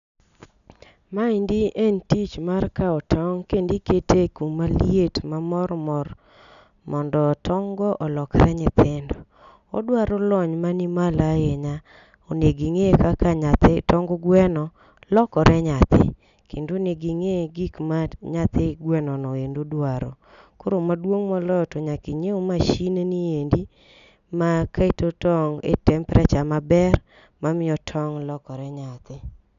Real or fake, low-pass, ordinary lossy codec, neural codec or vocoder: real; 7.2 kHz; none; none